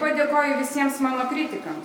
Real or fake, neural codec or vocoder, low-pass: real; none; 19.8 kHz